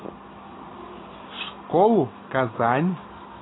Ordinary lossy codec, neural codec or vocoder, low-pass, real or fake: AAC, 16 kbps; none; 7.2 kHz; real